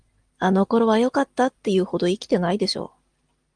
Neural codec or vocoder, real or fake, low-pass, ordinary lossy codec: none; real; 9.9 kHz; Opus, 24 kbps